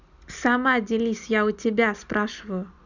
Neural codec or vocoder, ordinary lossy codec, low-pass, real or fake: none; none; 7.2 kHz; real